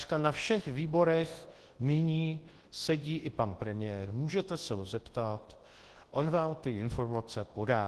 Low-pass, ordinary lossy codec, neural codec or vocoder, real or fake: 10.8 kHz; Opus, 16 kbps; codec, 24 kHz, 0.9 kbps, WavTokenizer, large speech release; fake